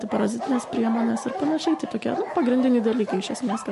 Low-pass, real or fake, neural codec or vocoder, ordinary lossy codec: 14.4 kHz; real; none; MP3, 48 kbps